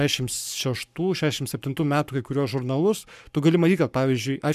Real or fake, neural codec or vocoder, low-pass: fake; codec, 44.1 kHz, 7.8 kbps, Pupu-Codec; 14.4 kHz